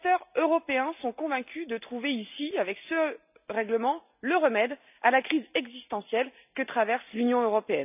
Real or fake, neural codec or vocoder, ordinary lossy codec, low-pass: real; none; none; 3.6 kHz